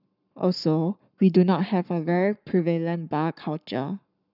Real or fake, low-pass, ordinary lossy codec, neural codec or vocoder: fake; 5.4 kHz; none; codec, 44.1 kHz, 7.8 kbps, Pupu-Codec